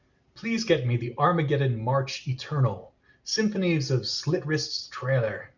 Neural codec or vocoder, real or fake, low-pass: none; real; 7.2 kHz